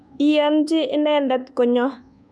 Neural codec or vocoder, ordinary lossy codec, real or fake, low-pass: codec, 24 kHz, 1.2 kbps, DualCodec; none; fake; none